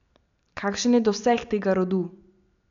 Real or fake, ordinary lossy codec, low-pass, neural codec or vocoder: real; none; 7.2 kHz; none